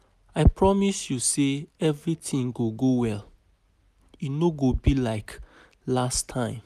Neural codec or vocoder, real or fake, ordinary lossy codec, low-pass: none; real; none; 14.4 kHz